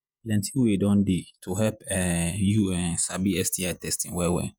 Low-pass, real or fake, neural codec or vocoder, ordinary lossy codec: none; fake; vocoder, 48 kHz, 128 mel bands, Vocos; none